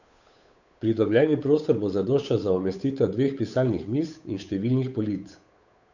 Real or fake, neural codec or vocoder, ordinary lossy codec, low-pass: fake; codec, 16 kHz, 8 kbps, FunCodec, trained on Chinese and English, 25 frames a second; none; 7.2 kHz